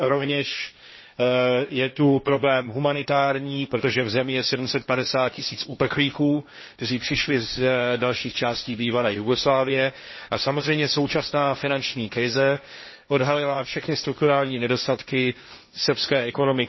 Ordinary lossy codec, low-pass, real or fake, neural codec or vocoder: MP3, 24 kbps; 7.2 kHz; fake; codec, 16 kHz, 1.1 kbps, Voila-Tokenizer